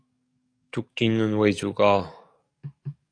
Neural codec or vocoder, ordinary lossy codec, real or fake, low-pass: codec, 44.1 kHz, 7.8 kbps, DAC; AAC, 48 kbps; fake; 9.9 kHz